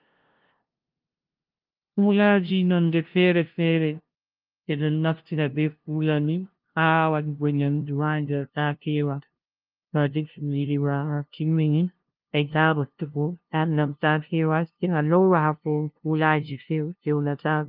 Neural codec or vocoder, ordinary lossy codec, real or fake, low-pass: codec, 16 kHz, 0.5 kbps, FunCodec, trained on LibriTTS, 25 frames a second; Opus, 32 kbps; fake; 5.4 kHz